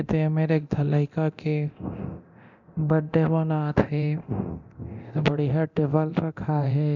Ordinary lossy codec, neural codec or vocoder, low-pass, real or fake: none; codec, 24 kHz, 0.9 kbps, DualCodec; 7.2 kHz; fake